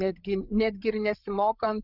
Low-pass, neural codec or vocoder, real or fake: 5.4 kHz; codec, 16 kHz, 8 kbps, FreqCodec, larger model; fake